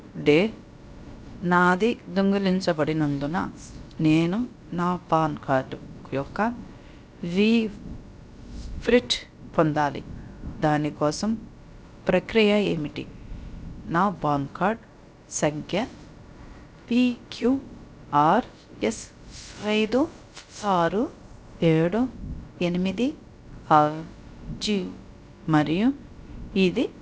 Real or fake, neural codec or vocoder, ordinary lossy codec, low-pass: fake; codec, 16 kHz, about 1 kbps, DyCAST, with the encoder's durations; none; none